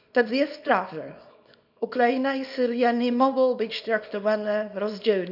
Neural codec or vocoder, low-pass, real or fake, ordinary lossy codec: codec, 24 kHz, 0.9 kbps, WavTokenizer, small release; 5.4 kHz; fake; none